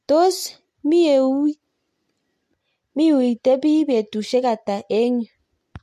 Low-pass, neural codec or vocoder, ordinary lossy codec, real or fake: 14.4 kHz; none; MP3, 64 kbps; real